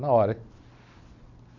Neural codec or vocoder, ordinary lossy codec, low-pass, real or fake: none; none; 7.2 kHz; real